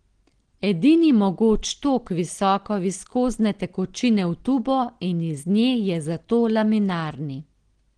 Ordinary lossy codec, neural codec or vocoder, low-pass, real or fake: Opus, 16 kbps; none; 9.9 kHz; real